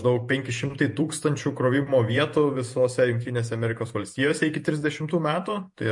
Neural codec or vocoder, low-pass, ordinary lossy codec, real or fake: vocoder, 48 kHz, 128 mel bands, Vocos; 10.8 kHz; MP3, 48 kbps; fake